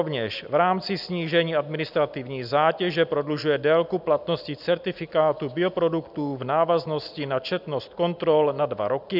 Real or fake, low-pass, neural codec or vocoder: real; 5.4 kHz; none